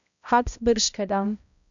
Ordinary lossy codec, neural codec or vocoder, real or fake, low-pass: none; codec, 16 kHz, 0.5 kbps, X-Codec, HuBERT features, trained on balanced general audio; fake; 7.2 kHz